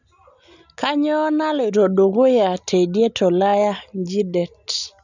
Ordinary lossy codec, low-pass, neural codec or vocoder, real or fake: none; 7.2 kHz; none; real